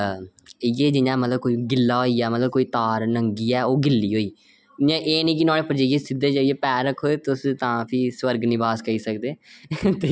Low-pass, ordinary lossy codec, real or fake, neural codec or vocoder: none; none; real; none